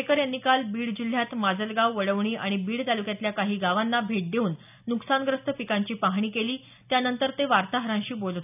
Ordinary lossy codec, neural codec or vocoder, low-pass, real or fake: none; none; 3.6 kHz; real